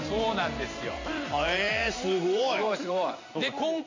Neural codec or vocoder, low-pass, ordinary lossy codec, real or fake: vocoder, 44.1 kHz, 128 mel bands every 512 samples, BigVGAN v2; 7.2 kHz; MP3, 48 kbps; fake